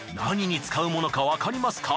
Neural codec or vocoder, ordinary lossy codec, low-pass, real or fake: none; none; none; real